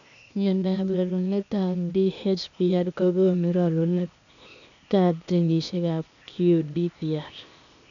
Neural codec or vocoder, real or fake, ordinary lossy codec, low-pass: codec, 16 kHz, 0.8 kbps, ZipCodec; fake; MP3, 96 kbps; 7.2 kHz